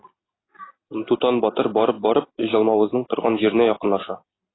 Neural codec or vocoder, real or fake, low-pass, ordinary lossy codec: none; real; 7.2 kHz; AAC, 16 kbps